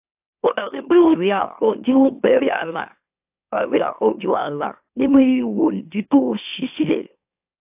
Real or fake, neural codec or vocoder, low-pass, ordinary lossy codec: fake; autoencoder, 44.1 kHz, a latent of 192 numbers a frame, MeloTTS; 3.6 kHz; AAC, 32 kbps